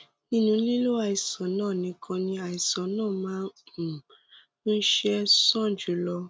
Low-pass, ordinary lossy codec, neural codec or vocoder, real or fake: none; none; none; real